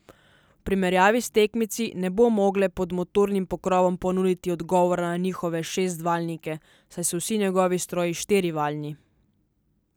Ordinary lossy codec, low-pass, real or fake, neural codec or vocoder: none; none; real; none